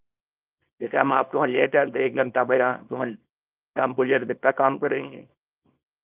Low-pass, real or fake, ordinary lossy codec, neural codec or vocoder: 3.6 kHz; fake; Opus, 24 kbps; codec, 24 kHz, 0.9 kbps, WavTokenizer, small release